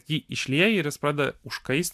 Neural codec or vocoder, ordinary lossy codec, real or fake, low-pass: none; MP3, 96 kbps; real; 14.4 kHz